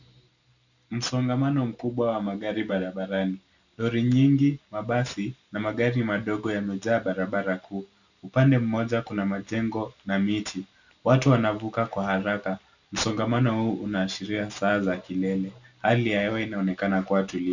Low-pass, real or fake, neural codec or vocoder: 7.2 kHz; real; none